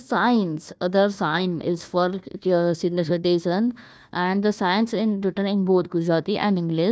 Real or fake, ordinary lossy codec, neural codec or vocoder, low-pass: fake; none; codec, 16 kHz, 1 kbps, FunCodec, trained on Chinese and English, 50 frames a second; none